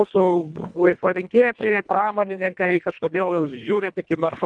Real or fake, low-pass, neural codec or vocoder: fake; 9.9 kHz; codec, 24 kHz, 1.5 kbps, HILCodec